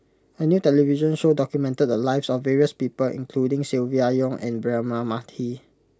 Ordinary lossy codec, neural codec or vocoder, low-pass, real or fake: none; none; none; real